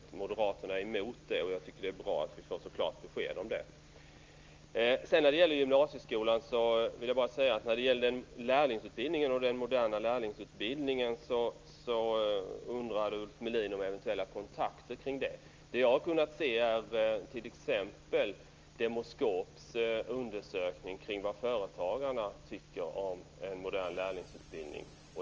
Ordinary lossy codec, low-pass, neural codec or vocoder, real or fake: Opus, 24 kbps; 7.2 kHz; none; real